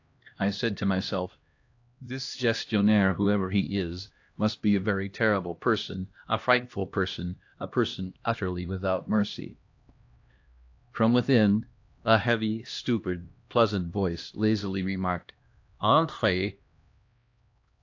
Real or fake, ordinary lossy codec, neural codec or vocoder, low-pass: fake; AAC, 48 kbps; codec, 16 kHz, 1 kbps, X-Codec, HuBERT features, trained on LibriSpeech; 7.2 kHz